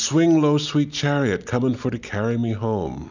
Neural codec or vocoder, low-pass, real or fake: none; 7.2 kHz; real